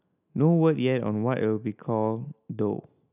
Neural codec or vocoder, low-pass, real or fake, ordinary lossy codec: none; 3.6 kHz; real; none